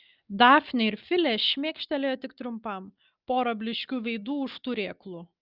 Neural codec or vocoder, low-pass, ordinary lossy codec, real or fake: none; 5.4 kHz; Opus, 24 kbps; real